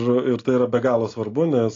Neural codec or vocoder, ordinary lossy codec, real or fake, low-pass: none; AAC, 32 kbps; real; 7.2 kHz